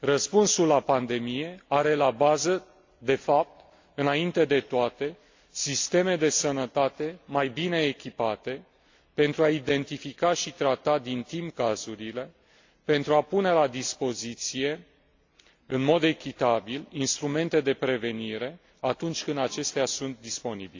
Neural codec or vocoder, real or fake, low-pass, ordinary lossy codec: none; real; 7.2 kHz; AAC, 48 kbps